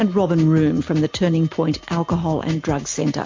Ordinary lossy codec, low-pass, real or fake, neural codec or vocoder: MP3, 48 kbps; 7.2 kHz; real; none